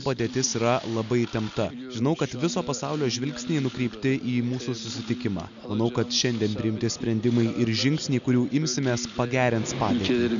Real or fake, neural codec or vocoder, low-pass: real; none; 7.2 kHz